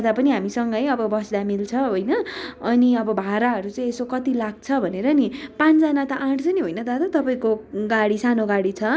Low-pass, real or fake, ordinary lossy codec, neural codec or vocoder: none; real; none; none